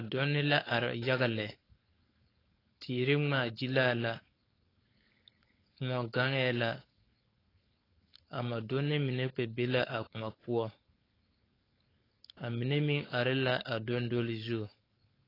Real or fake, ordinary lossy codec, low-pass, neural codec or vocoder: fake; AAC, 24 kbps; 5.4 kHz; codec, 16 kHz, 4.8 kbps, FACodec